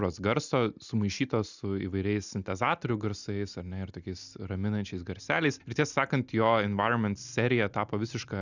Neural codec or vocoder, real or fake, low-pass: none; real; 7.2 kHz